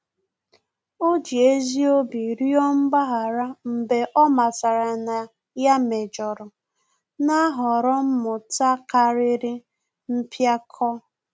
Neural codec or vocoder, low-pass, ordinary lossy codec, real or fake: none; none; none; real